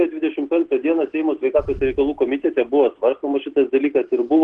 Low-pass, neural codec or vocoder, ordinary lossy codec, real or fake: 10.8 kHz; none; Opus, 16 kbps; real